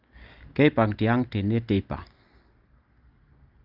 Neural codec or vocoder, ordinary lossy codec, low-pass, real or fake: none; Opus, 24 kbps; 5.4 kHz; real